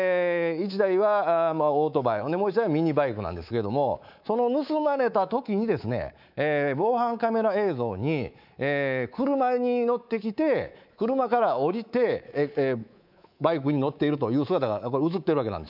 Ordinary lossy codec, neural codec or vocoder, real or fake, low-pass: none; codec, 24 kHz, 3.1 kbps, DualCodec; fake; 5.4 kHz